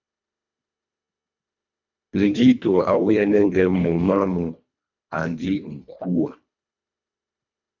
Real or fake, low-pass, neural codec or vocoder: fake; 7.2 kHz; codec, 24 kHz, 1.5 kbps, HILCodec